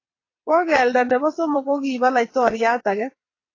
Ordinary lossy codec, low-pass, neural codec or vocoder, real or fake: AAC, 32 kbps; 7.2 kHz; vocoder, 22.05 kHz, 80 mel bands, WaveNeXt; fake